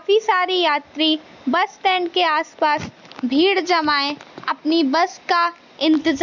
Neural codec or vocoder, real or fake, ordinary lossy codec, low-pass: none; real; none; 7.2 kHz